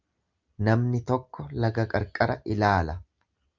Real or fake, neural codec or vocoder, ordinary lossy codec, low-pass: real; none; Opus, 32 kbps; 7.2 kHz